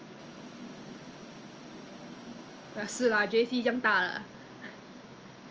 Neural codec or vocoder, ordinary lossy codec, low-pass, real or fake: none; Opus, 24 kbps; 7.2 kHz; real